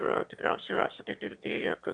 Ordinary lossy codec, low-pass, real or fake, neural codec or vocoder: Opus, 64 kbps; 9.9 kHz; fake; autoencoder, 22.05 kHz, a latent of 192 numbers a frame, VITS, trained on one speaker